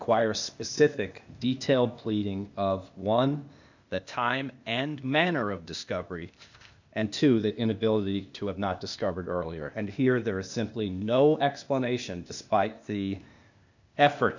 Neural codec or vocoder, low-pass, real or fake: codec, 16 kHz, 0.8 kbps, ZipCodec; 7.2 kHz; fake